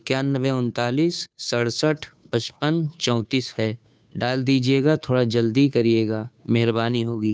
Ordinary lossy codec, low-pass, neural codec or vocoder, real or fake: none; none; codec, 16 kHz, 2 kbps, FunCodec, trained on Chinese and English, 25 frames a second; fake